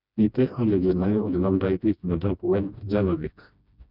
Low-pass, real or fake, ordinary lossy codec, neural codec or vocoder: 5.4 kHz; fake; none; codec, 16 kHz, 1 kbps, FreqCodec, smaller model